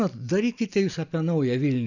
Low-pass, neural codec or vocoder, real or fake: 7.2 kHz; none; real